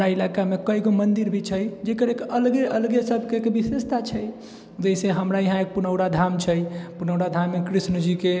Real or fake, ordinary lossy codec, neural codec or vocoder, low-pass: real; none; none; none